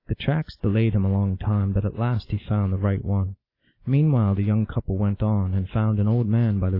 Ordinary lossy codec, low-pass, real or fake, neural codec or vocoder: AAC, 32 kbps; 5.4 kHz; real; none